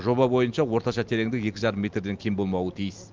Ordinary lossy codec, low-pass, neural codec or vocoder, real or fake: Opus, 32 kbps; 7.2 kHz; none; real